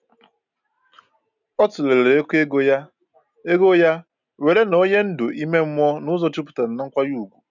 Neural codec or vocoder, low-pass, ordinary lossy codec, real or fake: none; 7.2 kHz; none; real